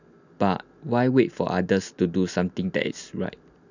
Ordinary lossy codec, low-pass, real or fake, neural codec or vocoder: none; 7.2 kHz; real; none